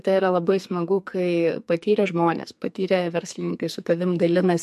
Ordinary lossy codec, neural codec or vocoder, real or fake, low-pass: MP3, 64 kbps; codec, 44.1 kHz, 2.6 kbps, SNAC; fake; 14.4 kHz